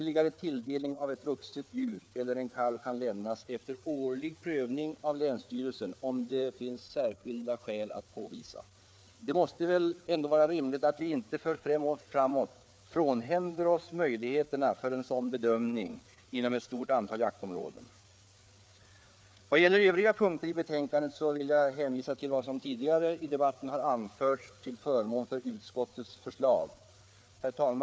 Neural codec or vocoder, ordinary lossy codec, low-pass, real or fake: codec, 16 kHz, 4 kbps, FreqCodec, larger model; none; none; fake